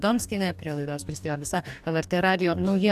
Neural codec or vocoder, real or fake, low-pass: codec, 44.1 kHz, 2.6 kbps, DAC; fake; 14.4 kHz